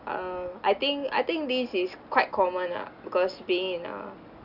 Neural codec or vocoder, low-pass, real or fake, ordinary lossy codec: none; 5.4 kHz; real; none